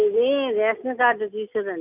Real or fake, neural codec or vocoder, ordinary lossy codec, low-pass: real; none; none; 3.6 kHz